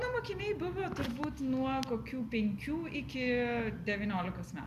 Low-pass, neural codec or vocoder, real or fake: 14.4 kHz; none; real